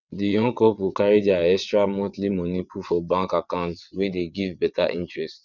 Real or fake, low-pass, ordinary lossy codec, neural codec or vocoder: fake; 7.2 kHz; none; vocoder, 22.05 kHz, 80 mel bands, WaveNeXt